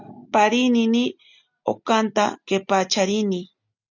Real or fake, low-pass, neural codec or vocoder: real; 7.2 kHz; none